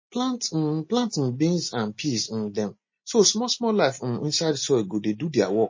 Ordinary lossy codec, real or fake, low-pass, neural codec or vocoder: MP3, 32 kbps; real; 7.2 kHz; none